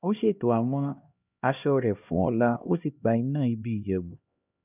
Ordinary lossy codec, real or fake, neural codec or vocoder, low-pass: none; fake; codec, 16 kHz, 1 kbps, X-Codec, HuBERT features, trained on LibriSpeech; 3.6 kHz